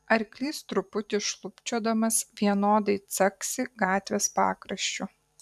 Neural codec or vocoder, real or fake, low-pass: vocoder, 44.1 kHz, 128 mel bands every 512 samples, BigVGAN v2; fake; 14.4 kHz